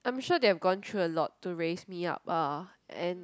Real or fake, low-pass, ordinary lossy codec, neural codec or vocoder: real; none; none; none